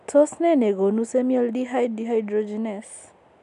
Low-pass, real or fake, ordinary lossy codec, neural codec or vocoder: 10.8 kHz; real; none; none